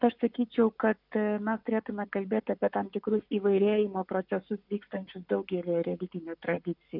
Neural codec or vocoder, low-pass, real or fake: codec, 44.1 kHz, 7.8 kbps, Pupu-Codec; 5.4 kHz; fake